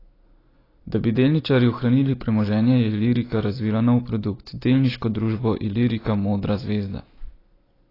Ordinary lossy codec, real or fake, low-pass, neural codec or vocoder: AAC, 24 kbps; real; 5.4 kHz; none